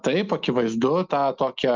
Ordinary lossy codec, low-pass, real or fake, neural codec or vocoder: Opus, 24 kbps; 7.2 kHz; real; none